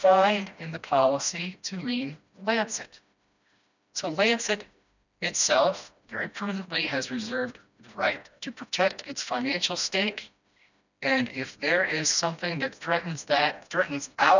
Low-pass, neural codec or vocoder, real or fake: 7.2 kHz; codec, 16 kHz, 1 kbps, FreqCodec, smaller model; fake